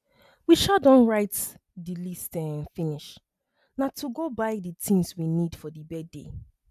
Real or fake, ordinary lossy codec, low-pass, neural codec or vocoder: real; none; 14.4 kHz; none